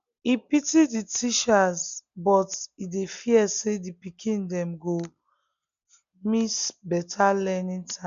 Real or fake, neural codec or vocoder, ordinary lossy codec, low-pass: real; none; none; 7.2 kHz